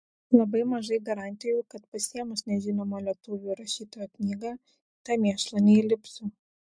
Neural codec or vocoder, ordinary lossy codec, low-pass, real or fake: none; MP3, 64 kbps; 9.9 kHz; real